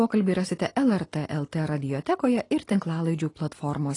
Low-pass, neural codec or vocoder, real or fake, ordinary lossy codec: 10.8 kHz; none; real; AAC, 32 kbps